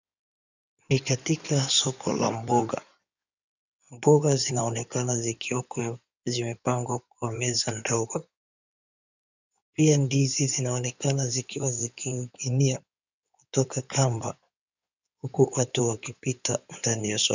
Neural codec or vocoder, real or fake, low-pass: codec, 16 kHz in and 24 kHz out, 2.2 kbps, FireRedTTS-2 codec; fake; 7.2 kHz